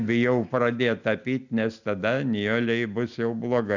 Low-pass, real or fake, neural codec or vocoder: 7.2 kHz; real; none